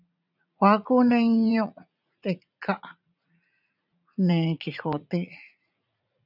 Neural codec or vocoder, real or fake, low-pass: vocoder, 44.1 kHz, 80 mel bands, Vocos; fake; 5.4 kHz